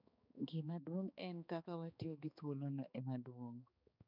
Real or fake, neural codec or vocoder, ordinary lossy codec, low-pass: fake; codec, 16 kHz, 2 kbps, X-Codec, HuBERT features, trained on balanced general audio; none; 5.4 kHz